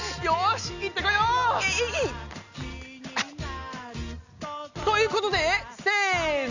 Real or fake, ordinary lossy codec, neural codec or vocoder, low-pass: real; none; none; 7.2 kHz